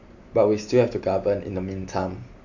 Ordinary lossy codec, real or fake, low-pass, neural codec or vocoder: MP3, 48 kbps; real; 7.2 kHz; none